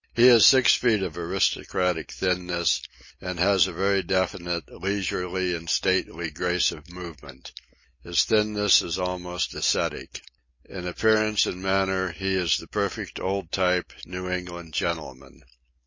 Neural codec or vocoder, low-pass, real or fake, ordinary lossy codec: none; 7.2 kHz; real; MP3, 32 kbps